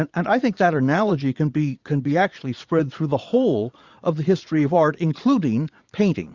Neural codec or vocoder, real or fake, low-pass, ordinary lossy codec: vocoder, 22.05 kHz, 80 mel bands, Vocos; fake; 7.2 kHz; Opus, 64 kbps